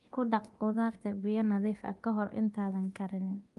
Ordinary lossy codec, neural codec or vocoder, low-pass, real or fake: Opus, 24 kbps; codec, 24 kHz, 0.9 kbps, DualCodec; 10.8 kHz; fake